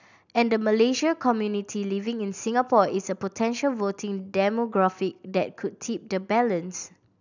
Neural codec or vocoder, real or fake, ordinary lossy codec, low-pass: none; real; none; 7.2 kHz